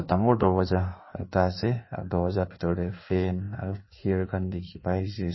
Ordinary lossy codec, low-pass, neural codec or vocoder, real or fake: MP3, 24 kbps; 7.2 kHz; codec, 16 kHz in and 24 kHz out, 1.1 kbps, FireRedTTS-2 codec; fake